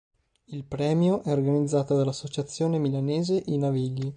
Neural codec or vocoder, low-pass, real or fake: none; 9.9 kHz; real